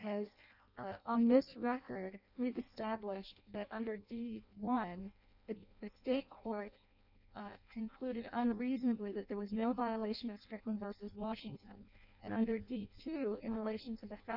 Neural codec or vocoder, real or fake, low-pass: codec, 16 kHz in and 24 kHz out, 0.6 kbps, FireRedTTS-2 codec; fake; 5.4 kHz